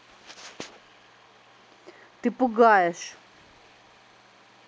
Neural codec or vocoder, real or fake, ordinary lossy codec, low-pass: none; real; none; none